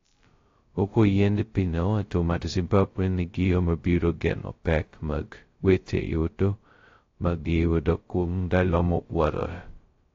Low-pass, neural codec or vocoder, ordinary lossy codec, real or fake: 7.2 kHz; codec, 16 kHz, 0.2 kbps, FocalCodec; AAC, 32 kbps; fake